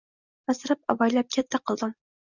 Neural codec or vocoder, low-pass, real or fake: none; 7.2 kHz; real